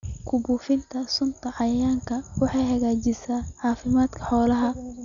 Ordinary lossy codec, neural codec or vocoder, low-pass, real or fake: none; none; 7.2 kHz; real